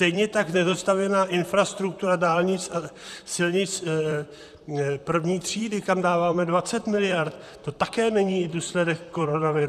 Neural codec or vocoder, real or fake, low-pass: vocoder, 44.1 kHz, 128 mel bands, Pupu-Vocoder; fake; 14.4 kHz